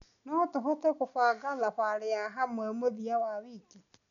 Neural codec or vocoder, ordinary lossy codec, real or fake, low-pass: none; none; real; 7.2 kHz